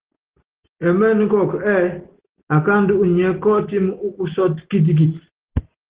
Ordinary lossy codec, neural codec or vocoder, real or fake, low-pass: Opus, 16 kbps; none; real; 3.6 kHz